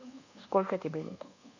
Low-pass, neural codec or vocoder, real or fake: 7.2 kHz; codec, 24 kHz, 1.2 kbps, DualCodec; fake